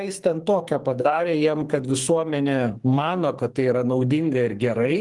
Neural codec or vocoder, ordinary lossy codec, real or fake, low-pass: codec, 44.1 kHz, 2.6 kbps, SNAC; Opus, 24 kbps; fake; 10.8 kHz